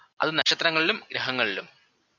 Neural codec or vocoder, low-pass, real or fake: none; 7.2 kHz; real